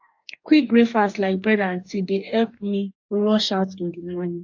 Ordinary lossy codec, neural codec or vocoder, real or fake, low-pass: AAC, 48 kbps; codec, 44.1 kHz, 2.6 kbps, DAC; fake; 7.2 kHz